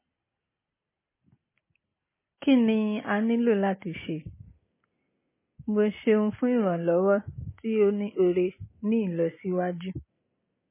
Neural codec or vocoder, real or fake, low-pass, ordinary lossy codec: none; real; 3.6 kHz; MP3, 16 kbps